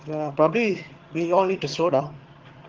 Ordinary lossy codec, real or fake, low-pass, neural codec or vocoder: Opus, 16 kbps; fake; 7.2 kHz; vocoder, 22.05 kHz, 80 mel bands, HiFi-GAN